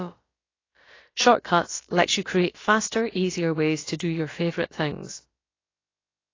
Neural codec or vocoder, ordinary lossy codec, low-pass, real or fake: codec, 16 kHz, about 1 kbps, DyCAST, with the encoder's durations; AAC, 32 kbps; 7.2 kHz; fake